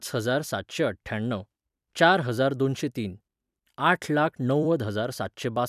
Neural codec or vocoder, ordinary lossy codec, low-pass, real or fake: vocoder, 44.1 kHz, 128 mel bands every 256 samples, BigVGAN v2; none; 14.4 kHz; fake